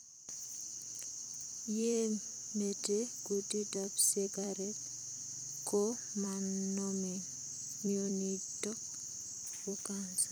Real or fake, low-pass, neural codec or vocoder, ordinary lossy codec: fake; none; vocoder, 44.1 kHz, 128 mel bands every 256 samples, BigVGAN v2; none